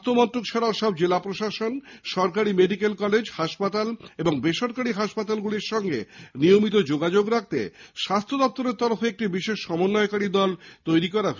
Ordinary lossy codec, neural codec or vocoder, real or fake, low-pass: none; none; real; 7.2 kHz